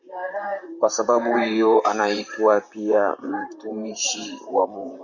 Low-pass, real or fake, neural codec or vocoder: 7.2 kHz; fake; vocoder, 44.1 kHz, 128 mel bands, Pupu-Vocoder